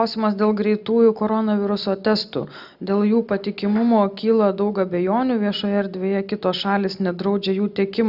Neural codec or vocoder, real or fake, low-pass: none; real; 5.4 kHz